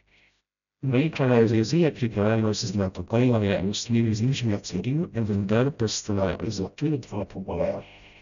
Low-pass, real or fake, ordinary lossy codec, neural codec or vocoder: 7.2 kHz; fake; none; codec, 16 kHz, 0.5 kbps, FreqCodec, smaller model